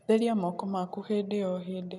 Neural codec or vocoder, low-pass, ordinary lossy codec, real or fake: none; none; none; real